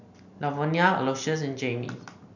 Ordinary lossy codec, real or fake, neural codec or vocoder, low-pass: none; real; none; 7.2 kHz